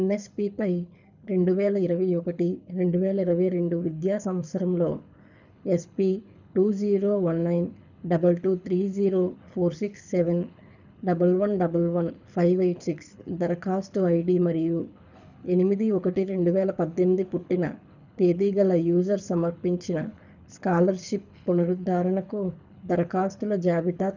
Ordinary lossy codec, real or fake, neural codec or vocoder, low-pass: none; fake; codec, 24 kHz, 6 kbps, HILCodec; 7.2 kHz